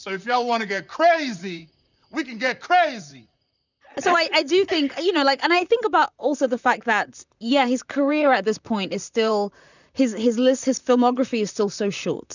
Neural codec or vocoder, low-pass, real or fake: vocoder, 44.1 kHz, 128 mel bands, Pupu-Vocoder; 7.2 kHz; fake